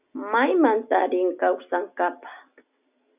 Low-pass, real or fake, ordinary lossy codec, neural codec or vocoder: 3.6 kHz; real; AAC, 32 kbps; none